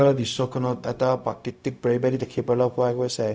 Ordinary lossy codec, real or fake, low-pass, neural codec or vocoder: none; fake; none; codec, 16 kHz, 0.4 kbps, LongCat-Audio-Codec